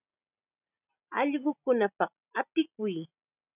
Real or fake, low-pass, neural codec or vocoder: real; 3.6 kHz; none